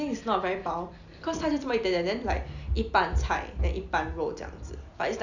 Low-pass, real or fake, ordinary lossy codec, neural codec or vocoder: 7.2 kHz; real; none; none